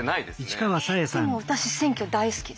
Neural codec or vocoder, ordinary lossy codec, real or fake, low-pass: none; none; real; none